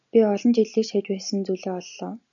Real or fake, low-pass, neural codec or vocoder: real; 7.2 kHz; none